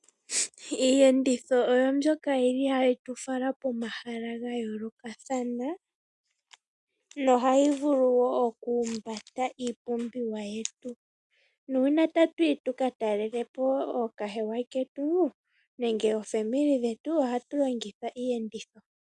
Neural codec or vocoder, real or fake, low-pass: none; real; 10.8 kHz